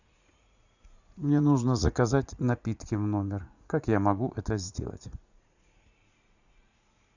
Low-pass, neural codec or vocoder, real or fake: 7.2 kHz; vocoder, 44.1 kHz, 80 mel bands, Vocos; fake